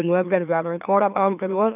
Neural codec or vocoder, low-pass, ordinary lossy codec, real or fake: autoencoder, 44.1 kHz, a latent of 192 numbers a frame, MeloTTS; 3.6 kHz; none; fake